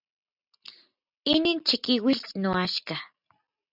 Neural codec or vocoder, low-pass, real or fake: none; 5.4 kHz; real